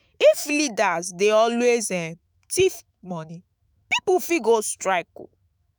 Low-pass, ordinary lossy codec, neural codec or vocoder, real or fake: none; none; autoencoder, 48 kHz, 128 numbers a frame, DAC-VAE, trained on Japanese speech; fake